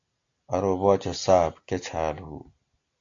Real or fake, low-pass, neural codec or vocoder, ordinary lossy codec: real; 7.2 kHz; none; AAC, 64 kbps